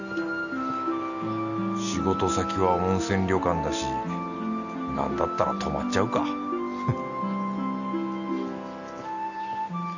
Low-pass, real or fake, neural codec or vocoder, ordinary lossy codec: 7.2 kHz; real; none; none